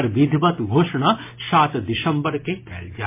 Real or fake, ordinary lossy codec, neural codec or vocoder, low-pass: real; MP3, 24 kbps; none; 3.6 kHz